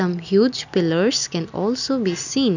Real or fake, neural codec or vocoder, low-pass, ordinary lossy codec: real; none; 7.2 kHz; none